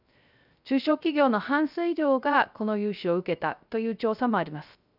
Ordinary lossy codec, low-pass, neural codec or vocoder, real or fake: none; 5.4 kHz; codec, 16 kHz, 0.3 kbps, FocalCodec; fake